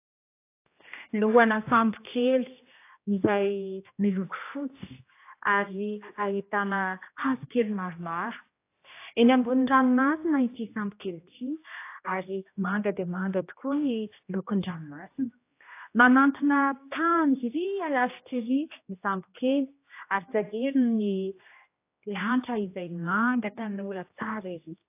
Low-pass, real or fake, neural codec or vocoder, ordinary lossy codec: 3.6 kHz; fake; codec, 16 kHz, 1 kbps, X-Codec, HuBERT features, trained on general audio; AAC, 24 kbps